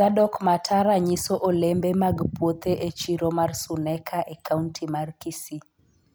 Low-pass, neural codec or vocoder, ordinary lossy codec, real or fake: none; none; none; real